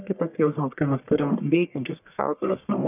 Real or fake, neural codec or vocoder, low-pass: fake; codec, 44.1 kHz, 1.7 kbps, Pupu-Codec; 3.6 kHz